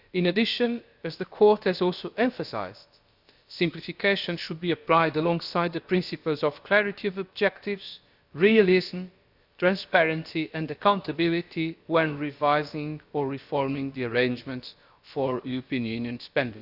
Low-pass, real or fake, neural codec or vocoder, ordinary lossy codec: 5.4 kHz; fake; codec, 16 kHz, about 1 kbps, DyCAST, with the encoder's durations; Opus, 64 kbps